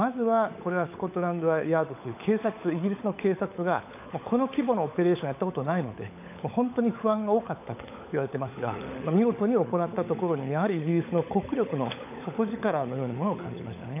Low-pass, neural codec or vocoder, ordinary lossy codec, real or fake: 3.6 kHz; codec, 16 kHz, 16 kbps, FunCodec, trained on LibriTTS, 50 frames a second; AAC, 32 kbps; fake